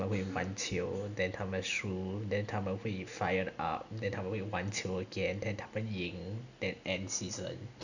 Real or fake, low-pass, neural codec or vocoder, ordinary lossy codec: real; 7.2 kHz; none; none